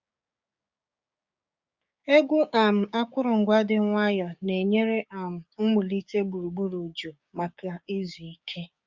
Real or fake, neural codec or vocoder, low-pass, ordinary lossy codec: fake; codec, 16 kHz, 6 kbps, DAC; 7.2 kHz; Opus, 64 kbps